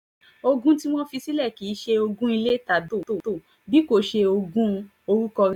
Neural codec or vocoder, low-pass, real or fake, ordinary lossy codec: none; 19.8 kHz; real; none